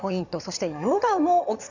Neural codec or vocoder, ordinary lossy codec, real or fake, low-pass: codec, 16 kHz in and 24 kHz out, 2.2 kbps, FireRedTTS-2 codec; none; fake; 7.2 kHz